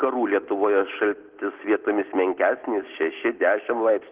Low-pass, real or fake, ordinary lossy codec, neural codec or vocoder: 3.6 kHz; real; Opus, 16 kbps; none